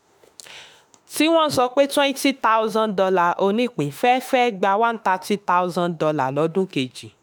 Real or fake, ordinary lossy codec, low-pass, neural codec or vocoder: fake; none; none; autoencoder, 48 kHz, 32 numbers a frame, DAC-VAE, trained on Japanese speech